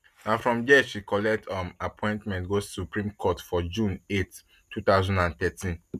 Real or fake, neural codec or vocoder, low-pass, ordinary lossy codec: real; none; 14.4 kHz; none